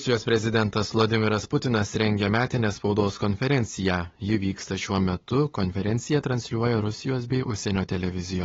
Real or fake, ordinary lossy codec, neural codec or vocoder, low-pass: fake; AAC, 24 kbps; codec, 16 kHz, 8 kbps, FunCodec, trained on LibriTTS, 25 frames a second; 7.2 kHz